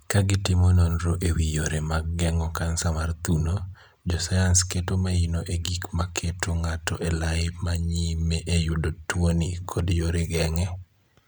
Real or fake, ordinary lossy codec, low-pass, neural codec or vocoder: real; none; none; none